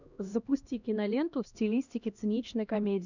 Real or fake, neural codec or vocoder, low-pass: fake; codec, 16 kHz, 1 kbps, X-Codec, HuBERT features, trained on LibriSpeech; 7.2 kHz